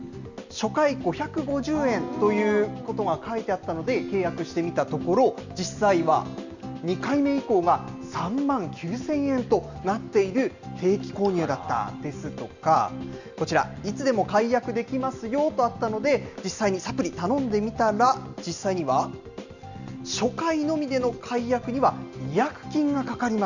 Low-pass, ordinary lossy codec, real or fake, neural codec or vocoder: 7.2 kHz; none; real; none